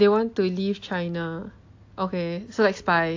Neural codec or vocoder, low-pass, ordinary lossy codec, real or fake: none; 7.2 kHz; AAC, 48 kbps; real